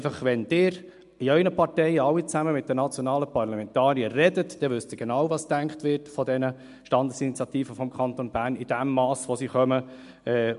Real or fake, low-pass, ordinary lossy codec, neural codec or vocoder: real; 10.8 kHz; MP3, 64 kbps; none